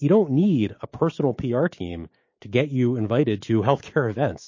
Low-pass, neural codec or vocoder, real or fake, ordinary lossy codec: 7.2 kHz; codec, 16 kHz, 6 kbps, DAC; fake; MP3, 32 kbps